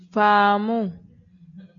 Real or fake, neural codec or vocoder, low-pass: real; none; 7.2 kHz